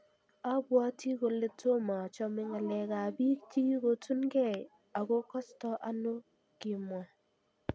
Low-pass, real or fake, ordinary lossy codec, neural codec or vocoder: none; real; none; none